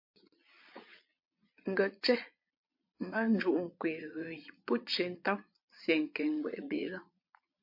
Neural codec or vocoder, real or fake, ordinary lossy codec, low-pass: vocoder, 22.05 kHz, 80 mel bands, Vocos; fake; MP3, 32 kbps; 5.4 kHz